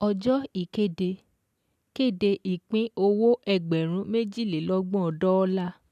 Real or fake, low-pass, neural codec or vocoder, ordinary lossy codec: real; 14.4 kHz; none; none